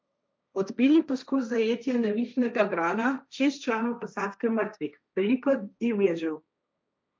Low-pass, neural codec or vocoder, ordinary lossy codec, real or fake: none; codec, 16 kHz, 1.1 kbps, Voila-Tokenizer; none; fake